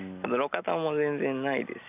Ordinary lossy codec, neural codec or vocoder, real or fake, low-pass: none; none; real; 3.6 kHz